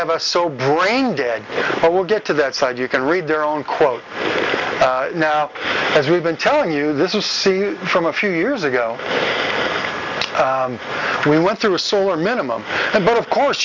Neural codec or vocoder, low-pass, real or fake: none; 7.2 kHz; real